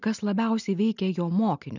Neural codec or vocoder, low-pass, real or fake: none; 7.2 kHz; real